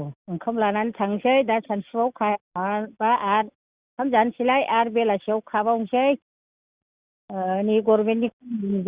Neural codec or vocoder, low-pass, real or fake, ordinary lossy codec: none; 3.6 kHz; real; Opus, 64 kbps